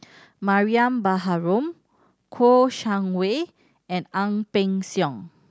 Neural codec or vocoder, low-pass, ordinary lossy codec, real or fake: none; none; none; real